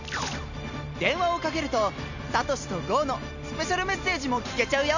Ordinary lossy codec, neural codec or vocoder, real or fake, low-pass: none; none; real; 7.2 kHz